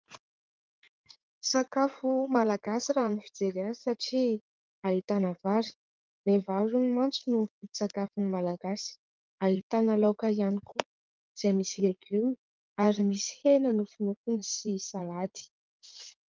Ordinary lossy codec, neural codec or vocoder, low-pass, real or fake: Opus, 24 kbps; codec, 16 kHz in and 24 kHz out, 2.2 kbps, FireRedTTS-2 codec; 7.2 kHz; fake